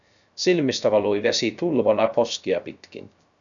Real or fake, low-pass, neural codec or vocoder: fake; 7.2 kHz; codec, 16 kHz, 0.3 kbps, FocalCodec